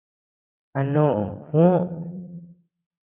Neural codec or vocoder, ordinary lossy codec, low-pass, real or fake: vocoder, 22.05 kHz, 80 mel bands, WaveNeXt; AAC, 32 kbps; 3.6 kHz; fake